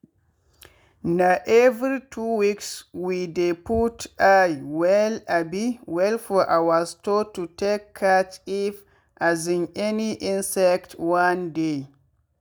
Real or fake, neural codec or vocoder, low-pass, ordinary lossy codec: real; none; none; none